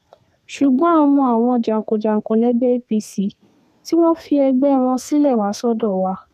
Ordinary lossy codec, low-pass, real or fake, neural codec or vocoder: none; 14.4 kHz; fake; codec, 32 kHz, 1.9 kbps, SNAC